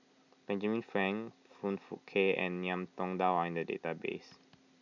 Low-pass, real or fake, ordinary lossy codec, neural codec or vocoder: 7.2 kHz; real; none; none